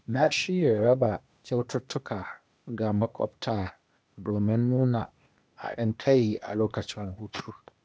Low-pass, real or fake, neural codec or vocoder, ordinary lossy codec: none; fake; codec, 16 kHz, 0.8 kbps, ZipCodec; none